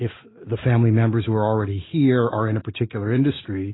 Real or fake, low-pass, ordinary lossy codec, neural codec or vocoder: real; 7.2 kHz; AAC, 16 kbps; none